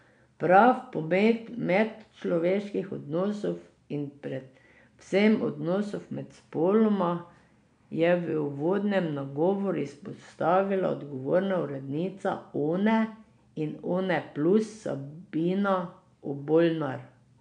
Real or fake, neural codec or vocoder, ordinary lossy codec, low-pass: real; none; MP3, 96 kbps; 9.9 kHz